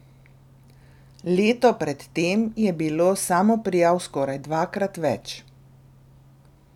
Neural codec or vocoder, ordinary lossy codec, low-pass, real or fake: none; none; 19.8 kHz; real